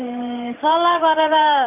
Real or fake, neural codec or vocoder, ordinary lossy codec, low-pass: fake; vocoder, 44.1 kHz, 128 mel bands every 512 samples, BigVGAN v2; none; 3.6 kHz